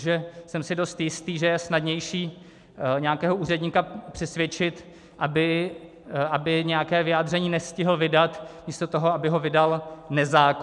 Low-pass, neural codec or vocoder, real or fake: 10.8 kHz; none; real